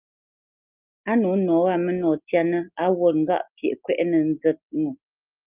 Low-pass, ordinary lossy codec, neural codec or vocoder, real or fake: 3.6 kHz; Opus, 32 kbps; none; real